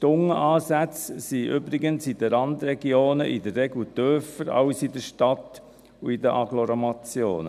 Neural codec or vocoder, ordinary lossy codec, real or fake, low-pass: none; none; real; 14.4 kHz